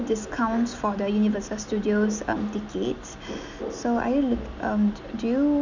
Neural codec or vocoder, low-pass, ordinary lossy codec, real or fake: none; 7.2 kHz; none; real